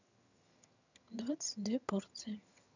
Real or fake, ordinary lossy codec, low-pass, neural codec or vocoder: fake; none; 7.2 kHz; vocoder, 22.05 kHz, 80 mel bands, HiFi-GAN